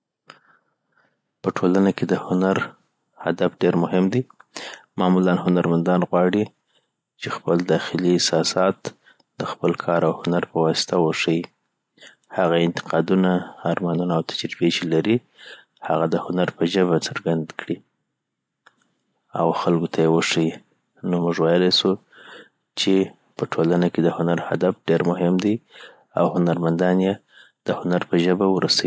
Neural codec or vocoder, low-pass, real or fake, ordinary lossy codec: none; none; real; none